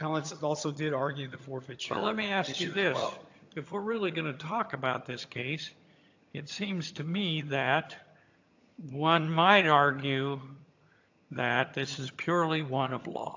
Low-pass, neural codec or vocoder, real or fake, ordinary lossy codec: 7.2 kHz; vocoder, 22.05 kHz, 80 mel bands, HiFi-GAN; fake; AAC, 48 kbps